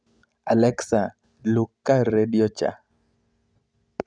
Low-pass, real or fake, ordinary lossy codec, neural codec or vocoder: 9.9 kHz; real; none; none